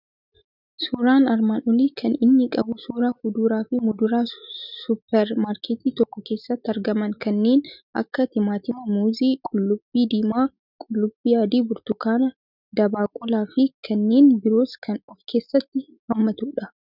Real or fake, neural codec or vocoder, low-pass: real; none; 5.4 kHz